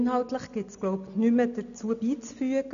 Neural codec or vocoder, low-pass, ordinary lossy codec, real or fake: none; 7.2 kHz; none; real